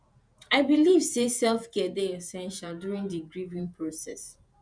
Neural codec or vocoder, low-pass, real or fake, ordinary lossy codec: vocoder, 48 kHz, 128 mel bands, Vocos; 9.9 kHz; fake; Opus, 64 kbps